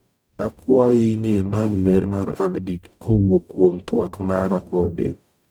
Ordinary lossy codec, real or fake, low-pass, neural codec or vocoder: none; fake; none; codec, 44.1 kHz, 0.9 kbps, DAC